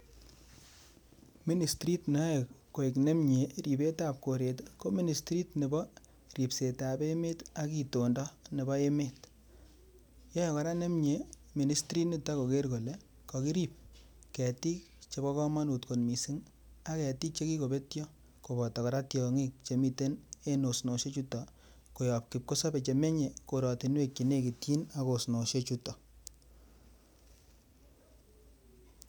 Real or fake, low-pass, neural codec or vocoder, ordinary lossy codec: real; none; none; none